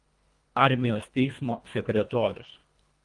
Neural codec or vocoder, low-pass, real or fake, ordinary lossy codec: codec, 24 kHz, 1.5 kbps, HILCodec; 10.8 kHz; fake; Opus, 32 kbps